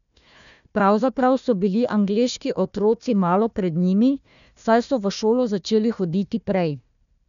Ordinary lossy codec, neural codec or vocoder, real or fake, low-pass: none; codec, 16 kHz, 1 kbps, FunCodec, trained on Chinese and English, 50 frames a second; fake; 7.2 kHz